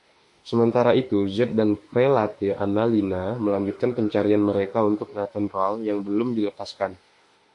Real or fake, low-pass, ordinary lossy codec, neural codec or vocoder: fake; 10.8 kHz; MP3, 48 kbps; autoencoder, 48 kHz, 32 numbers a frame, DAC-VAE, trained on Japanese speech